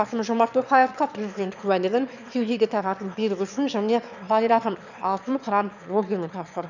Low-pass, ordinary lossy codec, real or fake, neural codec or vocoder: 7.2 kHz; none; fake; autoencoder, 22.05 kHz, a latent of 192 numbers a frame, VITS, trained on one speaker